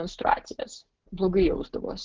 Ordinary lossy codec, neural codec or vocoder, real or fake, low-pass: Opus, 16 kbps; none; real; 7.2 kHz